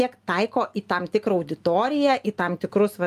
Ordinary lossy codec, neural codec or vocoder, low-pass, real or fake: Opus, 32 kbps; none; 14.4 kHz; real